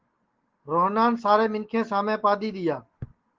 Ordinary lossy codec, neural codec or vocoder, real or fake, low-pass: Opus, 16 kbps; none; real; 7.2 kHz